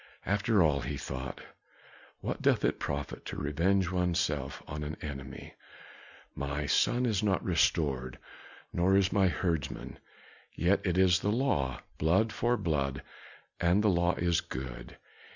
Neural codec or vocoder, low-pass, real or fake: none; 7.2 kHz; real